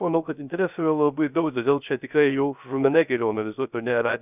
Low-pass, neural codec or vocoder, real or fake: 3.6 kHz; codec, 16 kHz, 0.3 kbps, FocalCodec; fake